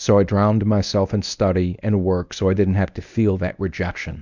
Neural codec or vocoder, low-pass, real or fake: codec, 24 kHz, 0.9 kbps, WavTokenizer, medium speech release version 1; 7.2 kHz; fake